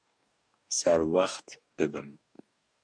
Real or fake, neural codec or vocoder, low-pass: fake; codec, 44.1 kHz, 2.6 kbps, DAC; 9.9 kHz